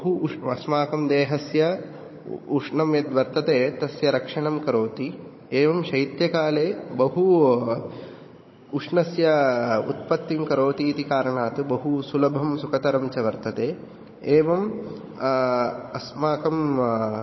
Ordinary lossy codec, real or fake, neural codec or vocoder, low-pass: MP3, 24 kbps; fake; codec, 16 kHz, 16 kbps, FunCodec, trained on Chinese and English, 50 frames a second; 7.2 kHz